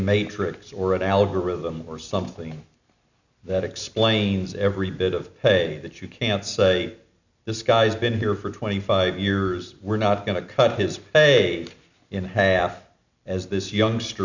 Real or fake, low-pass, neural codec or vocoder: real; 7.2 kHz; none